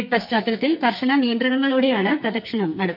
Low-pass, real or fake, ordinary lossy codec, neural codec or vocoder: 5.4 kHz; fake; none; codec, 44.1 kHz, 2.6 kbps, SNAC